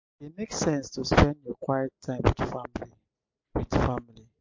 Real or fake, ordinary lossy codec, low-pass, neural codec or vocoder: real; MP3, 48 kbps; 7.2 kHz; none